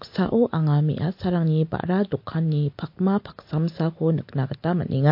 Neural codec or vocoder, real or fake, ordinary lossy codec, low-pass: none; real; MP3, 32 kbps; 5.4 kHz